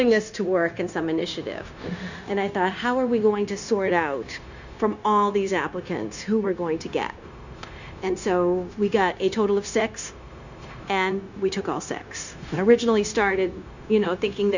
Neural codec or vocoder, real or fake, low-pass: codec, 16 kHz, 0.9 kbps, LongCat-Audio-Codec; fake; 7.2 kHz